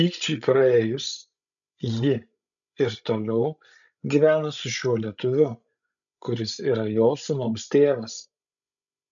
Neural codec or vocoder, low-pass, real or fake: codec, 16 kHz, 16 kbps, FreqCodec, larger model; 7.2 kHz; fake